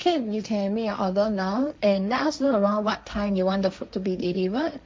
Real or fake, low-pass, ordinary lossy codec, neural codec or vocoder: fake; none; none; codec, 16 kHz, 1.1 kbps, Voila-Tokenizer